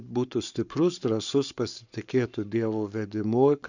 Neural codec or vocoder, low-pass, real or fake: codec, 44.1 kHz, 7.8 kbps, Pupu-Codec; 7.2 kHz; fake